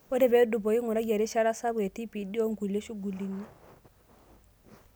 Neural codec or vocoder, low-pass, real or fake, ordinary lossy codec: none; none; real; none